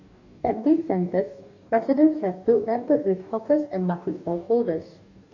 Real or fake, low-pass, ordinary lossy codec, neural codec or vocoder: fake; 7.2 kHz; none; codec, 44.1 kHz, 2.6 kbps, DAC